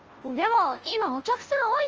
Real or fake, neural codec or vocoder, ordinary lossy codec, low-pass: fake; codec, 16 kHz, 0.5 kbps, FunCodec, trained on Chinese and English, 25 frames a second; Opus, 24 kbps; 7.2 kHz